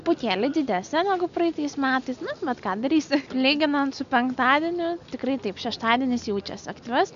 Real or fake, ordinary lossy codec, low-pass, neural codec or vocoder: real; AAC, 96 kbps; 7.2 kHz; none